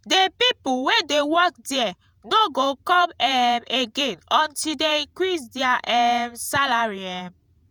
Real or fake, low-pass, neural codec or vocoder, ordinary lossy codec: fake; none; vocoder, 48 kHz, 128 mel bands, Vocos; none